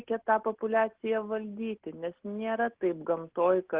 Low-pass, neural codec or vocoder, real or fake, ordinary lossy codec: 3.6 kHz; none; real; Opus, 16 kbps